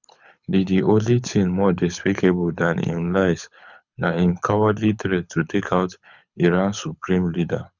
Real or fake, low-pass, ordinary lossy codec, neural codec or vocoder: fake; 7.2 kHz; none; codec, 24 kHz, 6 kbps, HILCodec